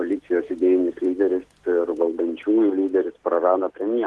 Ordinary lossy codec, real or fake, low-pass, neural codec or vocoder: Opus, 16 kbps; real; 10.8 kHz; none